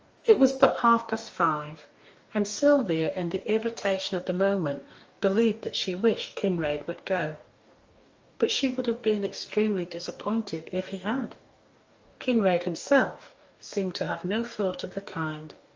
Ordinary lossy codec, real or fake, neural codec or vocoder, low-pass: Opus, 24 kbps; fake; codec, 44.1 kHz, 2.6 kbps, DAC; 7.2 kHz